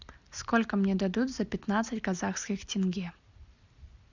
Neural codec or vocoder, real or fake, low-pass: none; real; 7.2 kHz